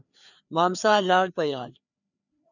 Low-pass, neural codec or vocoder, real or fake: 7.2 kHz; codec, 16 kHz, 2 kbps, FreqCodec, larger model; fake